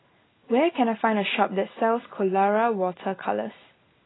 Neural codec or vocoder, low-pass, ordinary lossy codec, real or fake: none; 7.2 kHz; AAC, 16 kbps; real